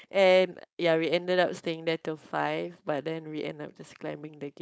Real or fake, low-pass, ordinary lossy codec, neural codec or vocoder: fake; none; none; codec, 16 kHz, 4.8 kbps, FACodec